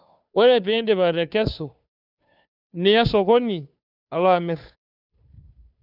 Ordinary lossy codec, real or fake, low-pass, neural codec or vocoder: none; fake; 5.4 kHz; codec, 16 kHz, 2 kbps, FunCodec, trained on Chinese and English, 25 frames a second